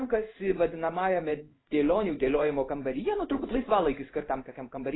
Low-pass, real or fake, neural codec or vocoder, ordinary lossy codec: 7.2 kHz; fake; codec, 16 kHz in and 24 kHz out, 1 kbps, XY-Tokenizer; AAC, 16 kbps